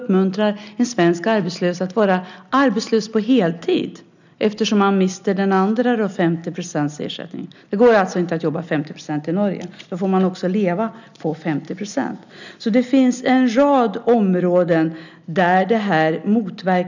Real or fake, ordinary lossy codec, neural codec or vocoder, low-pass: real; none; none; 7.2 kHz